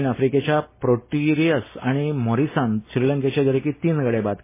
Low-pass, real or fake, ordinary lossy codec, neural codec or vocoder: 3.6 kHz; real; MP3, 16 kbps; none